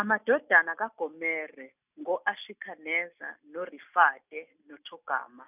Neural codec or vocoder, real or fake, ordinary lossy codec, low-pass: none; real; none; 3.6 kHz